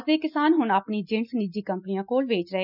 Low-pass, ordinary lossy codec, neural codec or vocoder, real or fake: 5.4 kHz; none; none; real